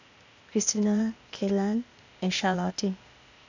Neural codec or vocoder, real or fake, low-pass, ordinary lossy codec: codec, 16 kHz, 0.8 kbps, ZipCodec; fake; 7.2 kHz; none